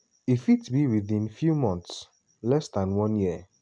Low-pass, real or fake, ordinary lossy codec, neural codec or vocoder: 9.9 kHz; real; none; none